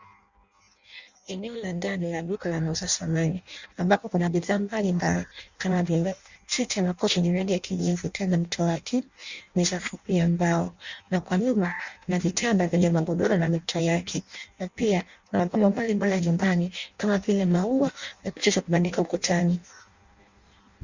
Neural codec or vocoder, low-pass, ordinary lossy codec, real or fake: codec, 16 kHz in and 24 kHz out, 0.6 kbps, FireRedTTS-2 codec; 7.2 kHz; Opus, 64 kbps; fake